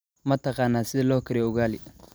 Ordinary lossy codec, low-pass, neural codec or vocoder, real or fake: none; none; none; real